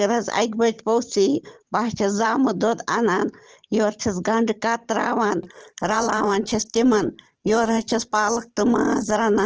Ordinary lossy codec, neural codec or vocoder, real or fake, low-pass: Opus, 16 kbps; vocoder, 44.1 kHz, 128 mel bands every 512 samples, BigVGAN v2; fake; 7.2 kHz